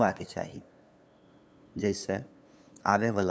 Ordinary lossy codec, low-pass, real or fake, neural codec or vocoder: none; none; fake; codec, 16 kHz, 2 kbps, FunCodec, trained on LibriTTS, 25 frames a second